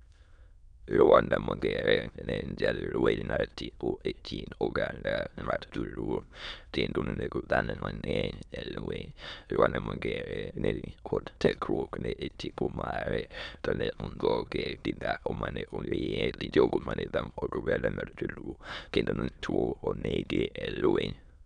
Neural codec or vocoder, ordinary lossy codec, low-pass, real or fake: autoencoder, 22.05 kHz, a latent of 192 numbers a frame, VITS, trained on many speakers; none; 9.9 kHz; fake